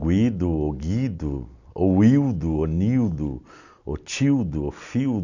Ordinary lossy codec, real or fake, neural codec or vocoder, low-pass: none; real; none; 7.2 kHz